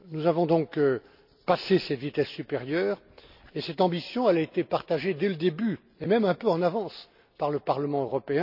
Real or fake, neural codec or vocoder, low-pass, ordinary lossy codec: real; none; 5.4 kHz; none